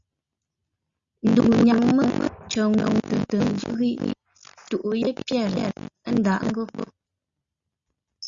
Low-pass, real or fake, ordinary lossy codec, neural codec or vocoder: 7.2 kHz; real; Opus, 64 kbps; none